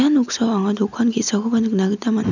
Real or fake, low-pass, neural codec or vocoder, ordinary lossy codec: real; 7.2 kHz; none; none